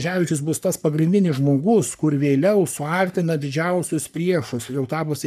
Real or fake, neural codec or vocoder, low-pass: fake; codec, 44.1 kHz, 3.4 kbps, Pupu-Codec; 14.4 kHz